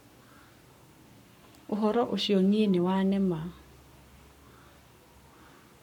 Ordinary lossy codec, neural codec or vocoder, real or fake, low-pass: none; codec, 44.1 kHz, 7.8 kbps, Pupu-Codec; fake; 19.8 kHz